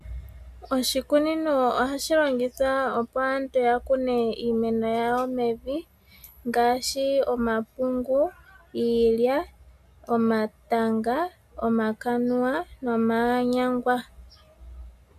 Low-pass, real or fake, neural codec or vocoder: 14.4 kHz; real; none